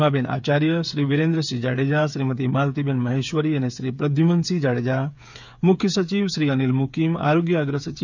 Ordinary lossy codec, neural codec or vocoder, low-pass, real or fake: none; codec, 16 kHz, 8 kbps, FreqCodec, smaller model; 7.2 kHz; fake